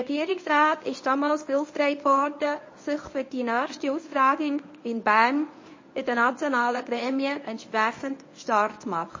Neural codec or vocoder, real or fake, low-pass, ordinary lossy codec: codec, 24 kHz, 0.9 kbps, WavTokenizer, medium speech release version 1; fake; 7.2 kHz; MP3, 32 kbps